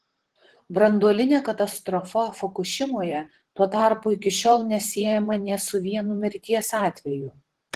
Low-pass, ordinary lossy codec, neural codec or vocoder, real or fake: 14.4 kHz; Opus, 16 kbps; vocoder, 44.1 kHz, 128 mel bands, Pupu-Vocoder; fake